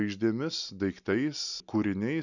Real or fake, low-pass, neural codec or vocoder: real; 7.2 kHz; none